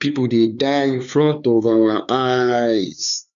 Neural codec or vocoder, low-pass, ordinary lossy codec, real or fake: codec, 16 kHz, 4 kbps, X-Codec, HuBERT features, trained on LibriSpeech; 7.2 kHz; MP3, 96 kbps; fake